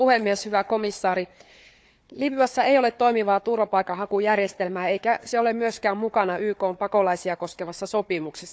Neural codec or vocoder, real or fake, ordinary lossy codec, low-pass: codec, 16 kHz, 4 kbps, FunCodec, trained on Chinese and English, 50 frames a second; fake; none; none